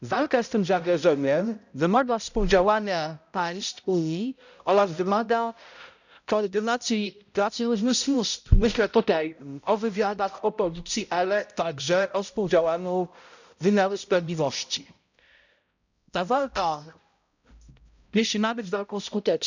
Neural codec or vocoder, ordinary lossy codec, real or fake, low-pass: codec, 16 kHz, 0.5 kbps, X-Codec, HuBERT features, trained on balanced general audio; none; fake; 7.2 kHz